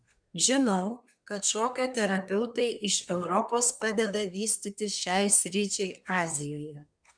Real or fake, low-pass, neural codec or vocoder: fake; 9.9 kHz; codec, 24 kHz, 1 kbps, SNAC